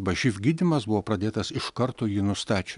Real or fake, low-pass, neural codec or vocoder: real; 10.8 kHz; none